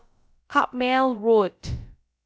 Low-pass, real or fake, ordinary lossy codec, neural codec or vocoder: none; fake; none; codec, 16 kHz, about 1 kbps, DyCAST, with the encoder's durations